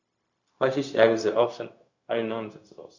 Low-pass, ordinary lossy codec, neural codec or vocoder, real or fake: 7.2 kHz; Opus, 64 kbps; codec, 16 kHz, 0.4 kbps, LongCat-Audio-Codec; fake